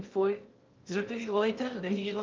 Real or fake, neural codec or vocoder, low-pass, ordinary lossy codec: fake; codec, 16 kHz in and 24 kHz out, 0.6 kbps, FocalCodec, streaming, 2048 codes; 7.2 kHz; Opus, 24 kbps